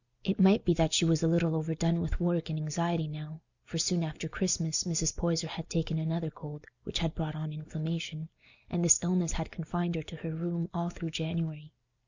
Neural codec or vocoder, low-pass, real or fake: none; 7.2 kHz; real